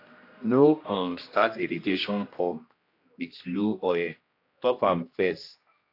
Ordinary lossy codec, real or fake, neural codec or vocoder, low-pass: AAC, 32 kbps; fake; codec, 16 kHz, 1 kbps, X-Codec, HuBERT features, trained on general audio; 5.4 kHz